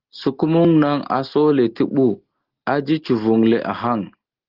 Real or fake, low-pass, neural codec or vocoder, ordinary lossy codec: real; 5.4 kHz; none; Opus, 16 kbps